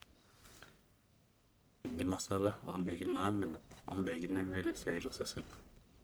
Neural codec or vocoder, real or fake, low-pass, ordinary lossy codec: codec, 44.1 kHz, 1.7 kbps, Pupu-Codec; fake; none; none